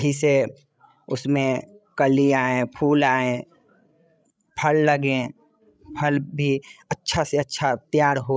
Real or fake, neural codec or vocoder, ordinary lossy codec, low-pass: fake; codec, 16 kHz, 16 kbps, FreqCodec, larger model; none; none